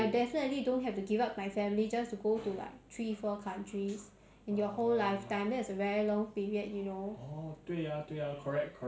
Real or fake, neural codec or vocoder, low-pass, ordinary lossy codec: real; none; none; none